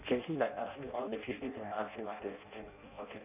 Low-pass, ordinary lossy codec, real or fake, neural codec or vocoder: 3.6 kHz; none; fake; codec, 16 kHz in and 24 kHz out, 0.6 kbps, FireRedTTS-2 codec